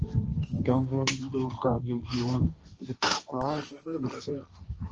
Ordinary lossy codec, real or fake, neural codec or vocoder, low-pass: Opus, 24 kbps; fake; codec, 16 kHz, 1.1 kbps, Voila-Tokenizer; 7.2 kHz